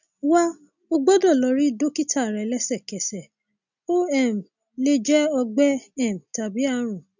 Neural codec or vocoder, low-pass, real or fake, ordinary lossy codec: none; 7.2 kHz; real; none